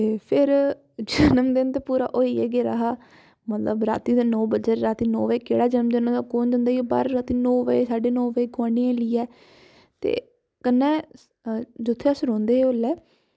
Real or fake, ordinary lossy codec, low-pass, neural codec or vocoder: real; none; none; none